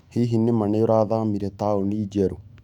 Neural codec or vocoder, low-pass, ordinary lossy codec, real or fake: autoencoder, 48 kHz, 128 numbers a frame, DAC-VAE, trained on Japanese speech; 19.8 kHz; none; fake